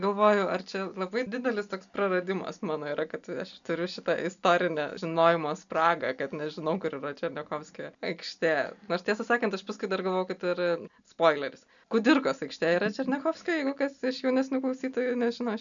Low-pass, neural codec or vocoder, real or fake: 7.2 kHz; none; real